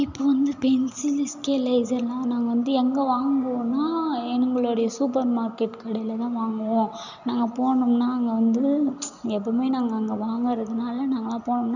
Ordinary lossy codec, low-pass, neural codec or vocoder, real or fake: none; 7.2 kHz; none; real